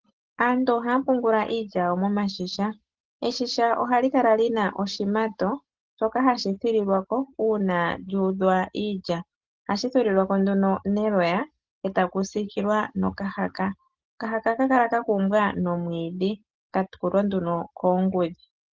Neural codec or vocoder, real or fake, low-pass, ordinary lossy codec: none; real; 7.2 kHz; Opus, 16 kbps